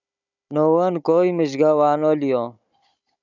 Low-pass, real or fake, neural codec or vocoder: 7.2 kHz; fake; codec, 16 kHz, 16 kbps, FunCodec, trained on Chinese and English, 50 frames a second